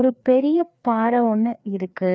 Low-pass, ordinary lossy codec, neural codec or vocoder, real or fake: none; none; codec, 16 kHz, 2 kbps, FreqCodec, larger model; fake